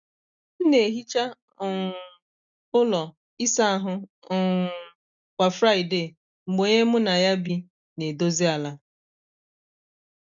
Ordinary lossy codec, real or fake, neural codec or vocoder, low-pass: none; real; none; 7.2 kHz